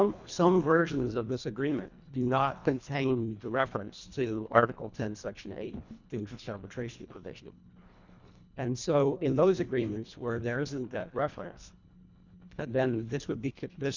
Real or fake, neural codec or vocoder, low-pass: fake; codec, 24 kHz, 1.5 kbps, HILCodec; 7.2 kHz